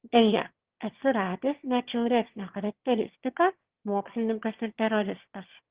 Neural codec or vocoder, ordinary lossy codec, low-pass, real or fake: autoencoder, 22.05 kHz, a latent of 192 numbers a frame, VITS, trained on one speaker; Opus, 16 kbps; 3.6 kHz; fake